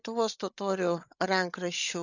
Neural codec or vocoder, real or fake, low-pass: codec, 16 kHz, 8 kbps, FreqCodec, larger model; fake; 7.2 kHz